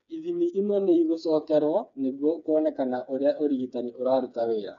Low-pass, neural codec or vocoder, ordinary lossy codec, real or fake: 7.2 kHz; codec, 16 kHz, 4 kbps, FreqCodec, smaller model; none; fake